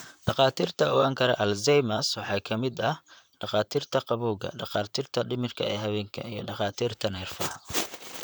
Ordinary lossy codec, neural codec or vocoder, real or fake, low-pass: none; vocoder, 44.1 kHz, 128 mel bands, Pupu-Vocoder; fake; none